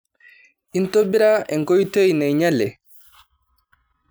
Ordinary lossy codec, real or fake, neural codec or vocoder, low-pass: none; real; none; none